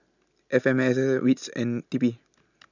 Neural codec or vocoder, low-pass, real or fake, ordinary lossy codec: none; 7.2 kHz; real; none